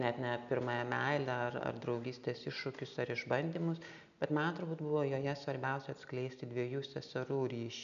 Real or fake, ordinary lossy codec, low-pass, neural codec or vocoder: real; AAC, 96 kbps; 7.2 kHz; none